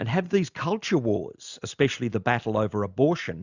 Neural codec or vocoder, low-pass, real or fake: none; 7.2 kHz; real